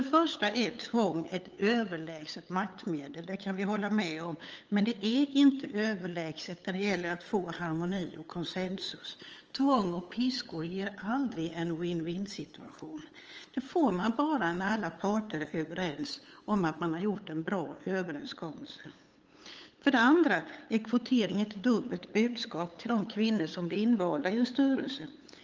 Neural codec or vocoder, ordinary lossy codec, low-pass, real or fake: codec, 16 kHz, 8 kbps, FunCodec, trained on LibriTTS, 25 frames a second; Opus, 24 kbps; 7.2 kHz; fake